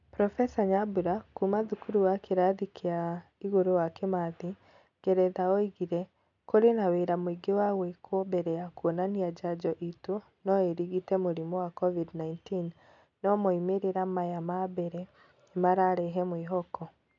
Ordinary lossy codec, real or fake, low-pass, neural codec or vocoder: MP3, 96 kbps; real; 7.2 kHz; none